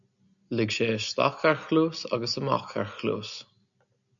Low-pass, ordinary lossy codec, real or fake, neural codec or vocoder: 7.2 kHz; MP3, 96 kbps; real; none